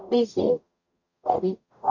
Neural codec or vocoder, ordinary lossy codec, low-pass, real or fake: codec, 44.1 kHz, 0.9 kbps, DAC; none; 7.2 kHz; fake